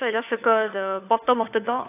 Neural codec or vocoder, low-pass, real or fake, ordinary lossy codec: codec, 16 kHz, 4 kbps, FunCodec, trained on LibriTTS, 50 frames a second; 3.6 kHz; fake; AAC, 32 kbps